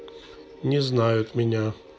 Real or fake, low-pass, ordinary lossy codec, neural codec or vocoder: real; none; none; none